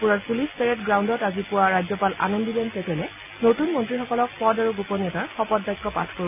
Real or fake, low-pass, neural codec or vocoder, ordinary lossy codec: real; 3.6 kHz; none; none